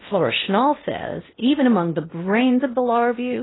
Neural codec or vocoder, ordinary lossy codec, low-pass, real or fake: codec, 16 kHz in and 24 kHz out, 0.8 kbps, FocalCodec, streaming, 65536 codes; AAC, 16 kbps; 7.2 kHz; fake